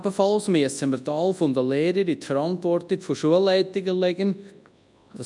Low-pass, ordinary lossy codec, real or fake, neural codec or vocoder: 10.8 kHz; MP3, 96 kbps; fake; codec, 24 kHz, 0.9 kbps, WavTokenizer, large speech release